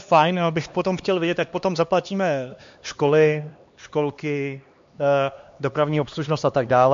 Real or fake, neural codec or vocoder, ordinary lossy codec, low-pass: fake; codec, 16 kHz, 2 kbps, X-Codec, HuBERT features, trained on LibriSpeech; MP3, 48 kbps; 7.2 kHz